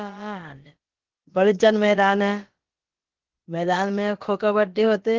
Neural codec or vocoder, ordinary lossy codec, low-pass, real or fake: codec, 16 kHz, about 1 kbps, DyCAST, with the encoder's durations; Opus, 16 kbps; 7.2 kHz; fake